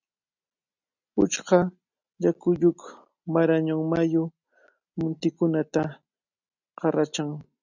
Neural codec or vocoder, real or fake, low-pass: none; real; 7.2 kHz